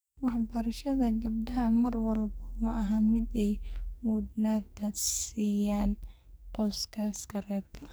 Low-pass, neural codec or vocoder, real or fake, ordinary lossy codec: none; codec, 44.1 kHz, 2.6 kbps, SNAC; fake; none